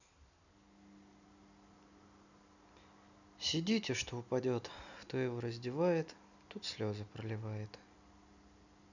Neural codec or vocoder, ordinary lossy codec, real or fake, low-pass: none; none; real; 7.2 kHz